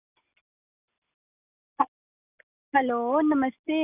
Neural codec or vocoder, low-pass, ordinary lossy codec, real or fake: none; 3.6 kHz; none; real